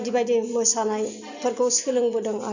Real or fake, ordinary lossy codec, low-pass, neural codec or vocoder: real; none; 7.2 kHz; none